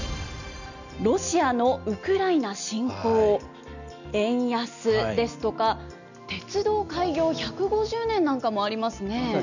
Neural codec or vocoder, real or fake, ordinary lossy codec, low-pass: none; real; none; 7.2 kHz